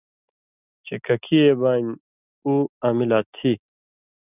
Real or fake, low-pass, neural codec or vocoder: real; 3.6 kHz; none